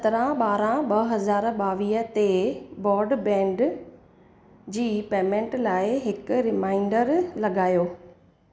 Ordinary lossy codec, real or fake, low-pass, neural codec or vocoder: none; real; none; none